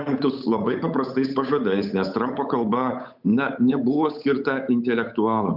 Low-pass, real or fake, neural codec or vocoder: 5.4 kHz; fake; codec, 16 kHz, 8 kbps, FunCodec, trained on Chinese and English, 25 frames a second